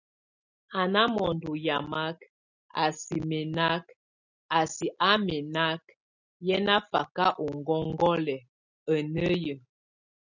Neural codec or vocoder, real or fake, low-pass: none; real; 7.2 kHz